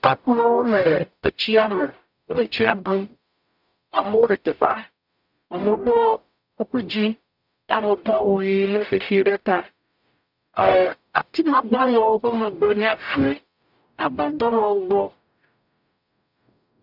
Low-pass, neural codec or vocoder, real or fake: 5.4 kHz; codec, 44.1 kHz, 0.9 kbps, DAC; fake